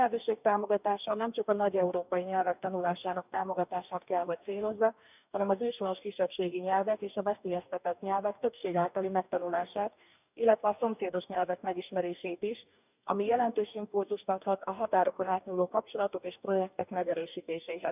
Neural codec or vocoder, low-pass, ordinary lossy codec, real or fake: codec, 44.1 kHz, 2.6 kbps, DAC; 3.6 kHz; none; fake